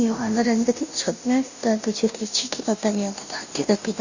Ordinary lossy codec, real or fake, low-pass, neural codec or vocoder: none; fake; 7.2 kHz; codec, 16 kHz, 0.5 kbps, FunCodec, trained on Chinese and English, 25 frames a second